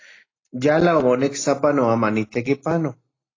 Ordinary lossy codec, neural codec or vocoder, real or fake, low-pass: AAC, 32 kbps; none; real; 7.2 kHz